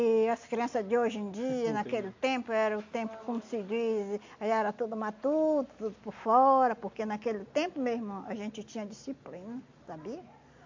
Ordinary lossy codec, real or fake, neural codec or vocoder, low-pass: MP3, 48 kbps; real; none; 7.2 kHz